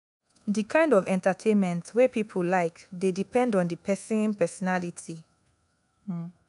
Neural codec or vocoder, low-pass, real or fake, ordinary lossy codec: codec, 24 kHz, 1.2 kbps, DualCodec; 10.8 kHz; fake; none